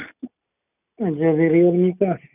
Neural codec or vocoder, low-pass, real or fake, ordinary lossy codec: none; 3.6 kHz; real; none